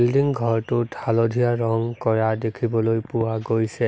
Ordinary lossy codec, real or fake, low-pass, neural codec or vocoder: none; real; none; none